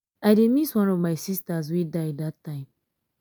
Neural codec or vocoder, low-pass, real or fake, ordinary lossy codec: none; none; real; none